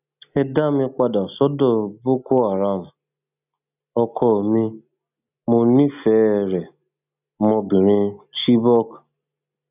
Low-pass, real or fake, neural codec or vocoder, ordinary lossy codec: 3.6 kHz; real; none; none